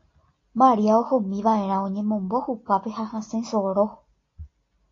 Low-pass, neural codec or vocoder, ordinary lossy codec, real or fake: 7.2 kHz; none; AAC, 32 kbps; real